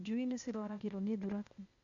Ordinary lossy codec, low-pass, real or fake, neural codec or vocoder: none; 7.2 kHz; fake; codec, 16 kHz, 0.8 kbps, ZipCodec